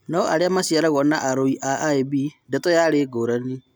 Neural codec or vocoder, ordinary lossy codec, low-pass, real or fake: none; none; none; real